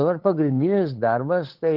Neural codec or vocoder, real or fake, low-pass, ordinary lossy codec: codec, 16 kHz, 8 kbps, FunCodec, trained on LibriTTS, 25 frames a second; fake; 5.4 kHz; Opus, 16 kbps